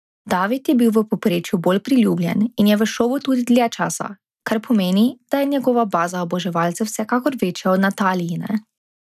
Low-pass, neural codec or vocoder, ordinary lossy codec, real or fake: 14.4 kHz; none; none; real